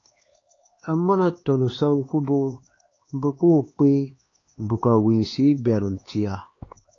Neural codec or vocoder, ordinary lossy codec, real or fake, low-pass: codec, 16 kHz, 2 kbps, X-Codec, HuBERT features, trained on LibriSpeech; AAC, 32 kbps; fake; 7.2 kHz